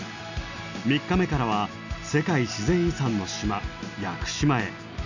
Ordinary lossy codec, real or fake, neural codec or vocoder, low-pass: none; real; none; 7.2 kHz